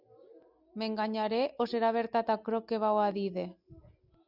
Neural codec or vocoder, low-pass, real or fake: none; 5.4 kHz; real